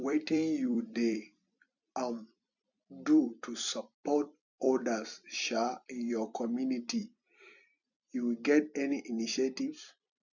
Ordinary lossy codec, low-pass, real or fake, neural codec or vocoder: none; 7.2 kHz; fake; vocoder, 44.1 kHz, 128 mel bands every 256 samples, BigVGAN v2